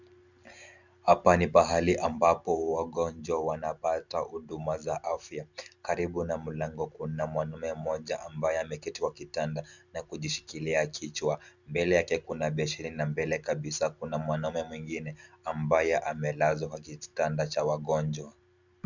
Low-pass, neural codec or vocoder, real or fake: 7.2 kHz; none; real